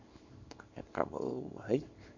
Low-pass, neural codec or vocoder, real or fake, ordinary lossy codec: 7.2 kHz; codec, 24 kHz, 0.9 kbps, WavTokenizer, small release; fake; MP3, 48 kbps